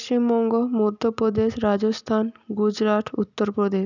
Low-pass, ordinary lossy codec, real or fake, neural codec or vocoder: 7.2 kHz; none; real; none